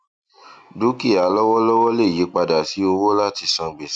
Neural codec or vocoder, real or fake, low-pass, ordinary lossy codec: none; real; 9.9 kHz; none